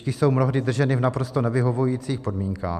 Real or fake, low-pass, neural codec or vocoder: real; 14.4 kHz; none